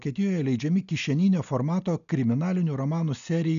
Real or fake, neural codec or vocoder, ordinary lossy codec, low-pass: real; none; MP3, 96 kbps; 7.2 kHz